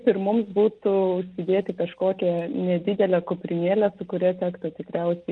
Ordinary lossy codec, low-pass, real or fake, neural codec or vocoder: Opus, 32 kbps; 9.9 kHz; real; none